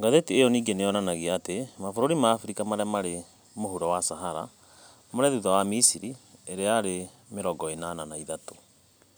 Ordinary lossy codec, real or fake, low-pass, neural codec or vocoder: none; real; none; none